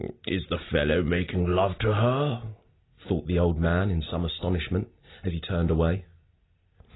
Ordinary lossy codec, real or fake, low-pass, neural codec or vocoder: AAC, 16 kbps; real; 7.2 kHz; none